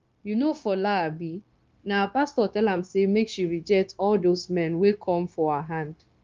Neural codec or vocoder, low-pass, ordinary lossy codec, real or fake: codec, 16 kHz, 0.9 kbps, LongCat-Audio-Codec; 7.2 kHz; Opus, 32 kbps; fake